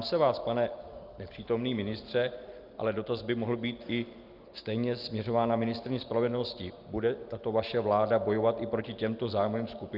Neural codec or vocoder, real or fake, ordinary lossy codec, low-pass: none; real; Opus, 24 kbps; 5.4 kHz